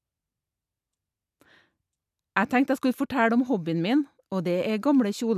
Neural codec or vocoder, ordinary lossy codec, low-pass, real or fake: none; none; 14.4 kHz; real